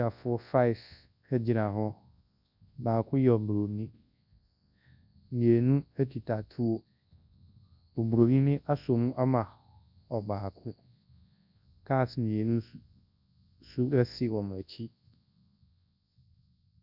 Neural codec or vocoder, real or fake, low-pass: codec, 24 kHz, 0.9 kbps, WavTokenizer, large speech release; fake; 5.4 kHz